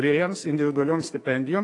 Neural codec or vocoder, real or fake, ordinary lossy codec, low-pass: codec, 44.1 kHz, 2.6 kbps, SNAC; fake; AAC, 48 kbps; 10.8 kHz